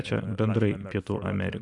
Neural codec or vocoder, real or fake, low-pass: none; real; 10.8 kHz